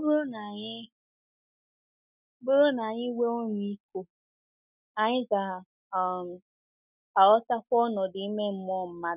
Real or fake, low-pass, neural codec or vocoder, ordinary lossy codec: real; 3.6 kHz; none; none